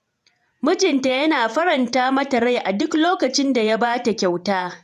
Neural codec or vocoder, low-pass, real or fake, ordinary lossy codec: vocoder, 48 kHz, 128 mel bands, Vocos; 14.4 kHz; fake; none